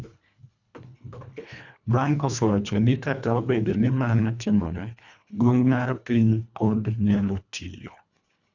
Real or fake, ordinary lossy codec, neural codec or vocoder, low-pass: fake; none; codec, 24 kHz, 1.5 kbps, HILCodec; 7.2 kHz